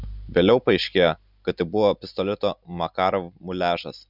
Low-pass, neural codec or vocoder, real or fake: 5.4 kHz; none; real